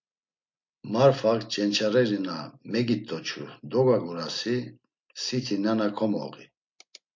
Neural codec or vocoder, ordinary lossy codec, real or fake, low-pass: none; MP3, 48 kbps; real; 7.2 kHz